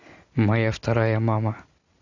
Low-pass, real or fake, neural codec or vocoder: 7.2 kHz; real; none